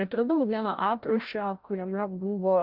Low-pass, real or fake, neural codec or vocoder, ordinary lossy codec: 5.4 kHz; fake; codec, 16 kHz, 0.5 kbps, FreqCodec, larger model; Opus, 24 kbps